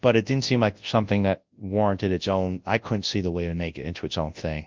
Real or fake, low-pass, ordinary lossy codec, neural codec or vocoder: fake; 7.2 kHz; Opus, 32 kbps; codec, 24 kHz, 0.9 kbps, WavTokenizer, large speech release